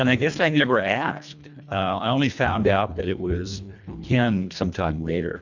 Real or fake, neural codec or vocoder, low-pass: fake; codec, 24 kHz, 1.5 kbps, HILCodec; 7.2 kHz